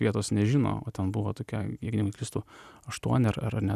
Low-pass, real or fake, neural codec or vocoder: 14.4 kHz; real; none